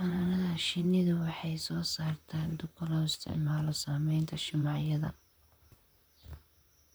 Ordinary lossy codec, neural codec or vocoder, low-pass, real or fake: none; vocoder, 44.1 kHz, 128 mel bands, Pupu-Vocoder; none; fake